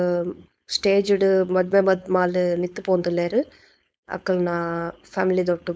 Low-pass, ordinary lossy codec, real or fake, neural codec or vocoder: none; none; fake; codec, 16 kHz, 4.8 kbps, FACodec